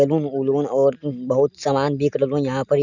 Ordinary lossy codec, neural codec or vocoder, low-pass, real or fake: none; none; 7.2 kHz; real